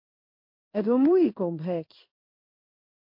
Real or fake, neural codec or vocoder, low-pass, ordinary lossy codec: fake; codec, 16 kHz in and 24 kHz out, 1 kbps, XY-Tokenizer; 5.4 kHz; MP3, 32 kbps